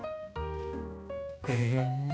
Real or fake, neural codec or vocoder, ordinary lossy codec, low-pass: fake; codec, 16 kHz, 2 kbps, X-Codec, HuBERT features, trained on balanced general audio; none; none